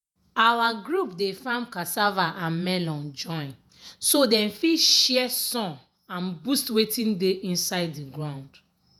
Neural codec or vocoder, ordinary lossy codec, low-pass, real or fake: vocoder, 48 kHz, 128 mel bands, Vocos; none; none; fake